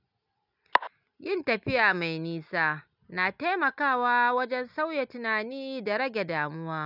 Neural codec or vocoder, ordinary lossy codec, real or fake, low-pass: none; none; real; 5.4 kHz